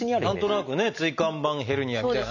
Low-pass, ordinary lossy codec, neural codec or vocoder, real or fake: 7.2 kHz; none; none; real